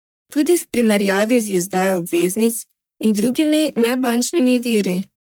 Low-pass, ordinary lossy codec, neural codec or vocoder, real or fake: none; none; codec, 44.1 kHz, 1.7 kbps, Pupu-Codec; fake